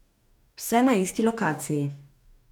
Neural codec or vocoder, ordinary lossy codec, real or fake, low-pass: codec, 44.1 kHz, 2.6 kbps, DAC; none; fake; 19.8 kHz